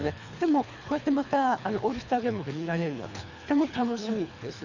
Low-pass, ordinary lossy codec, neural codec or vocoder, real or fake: 7.2 kHz; none; codec, 24 kHz, 3 kbps, HILCodec; fake